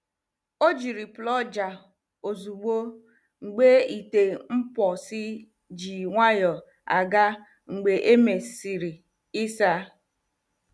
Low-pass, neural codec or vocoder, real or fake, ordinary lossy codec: none; none; real; none